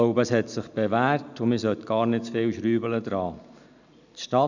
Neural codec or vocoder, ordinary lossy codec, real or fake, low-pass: none; none; real; 7.2 kHz